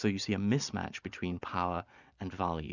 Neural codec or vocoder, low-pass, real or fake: none; 7.2 kHz; real